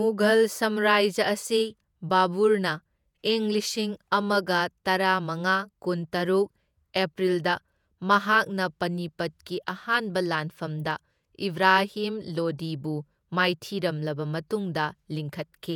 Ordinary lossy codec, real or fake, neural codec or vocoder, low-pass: none; fake; vocoder, 48 kHz, 128 mel bands, Vocos; 19.8 kHz